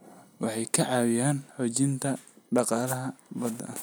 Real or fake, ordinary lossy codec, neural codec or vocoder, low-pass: real; none; none; none